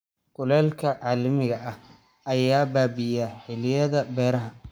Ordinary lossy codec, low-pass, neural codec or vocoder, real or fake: none; none; codec, 44.1 kHz, 7.8 kbps, Pupu-Codec; fake